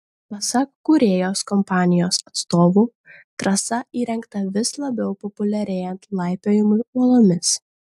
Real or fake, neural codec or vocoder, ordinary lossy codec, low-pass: real; none; AAC, 96 kbps; 14.4 kHz